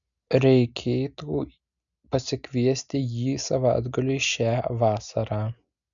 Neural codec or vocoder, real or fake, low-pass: none; real; 7.2 kHz